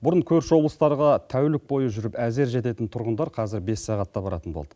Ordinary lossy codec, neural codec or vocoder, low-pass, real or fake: none; none; none; real